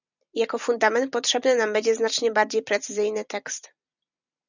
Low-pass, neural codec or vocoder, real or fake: 7.2 kHz; none; real